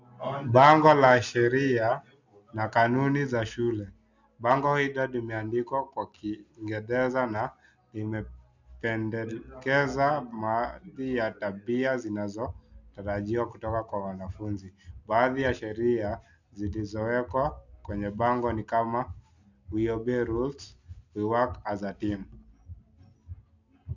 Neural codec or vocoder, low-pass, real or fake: none; 7.2 kHz; real